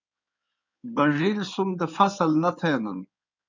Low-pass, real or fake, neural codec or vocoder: 7.2 kHz; fake; codec, 16 kHz in and 24 kHz out, 2.2 kbps, FireRedTTS-2 codec